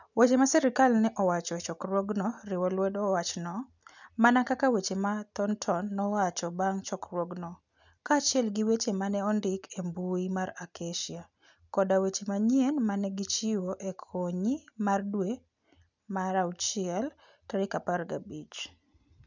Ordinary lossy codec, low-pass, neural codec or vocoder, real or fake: none; 7.2 kHz; none; real